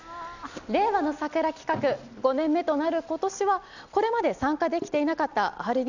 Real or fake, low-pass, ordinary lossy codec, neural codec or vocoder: real; 7.2 kHz; none; none